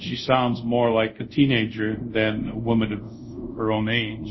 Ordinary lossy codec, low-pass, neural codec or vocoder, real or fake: MP3, 24 kbps; 7.2 kHz; codec, 24 kHz, 0.5 kbps, DualCodec; fake